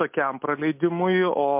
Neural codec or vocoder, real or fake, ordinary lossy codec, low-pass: none; real; MP3, 32 kbps; 3.6 kHz